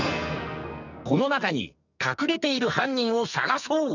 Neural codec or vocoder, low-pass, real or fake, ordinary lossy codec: codec, 44.1 kHz, 2.6 kbps, SNAC; 7.2 kHz; fake; none